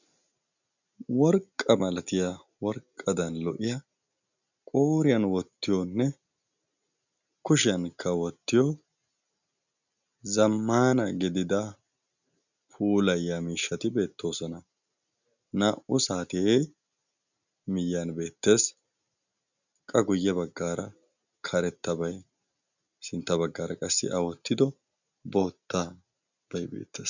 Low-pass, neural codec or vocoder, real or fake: 7.2 kHz; none; real